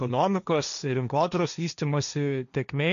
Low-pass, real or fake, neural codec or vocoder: 7.2 kHz; fake; codec, 16 kHz, 1.1 kbps, Voila-Tokenizer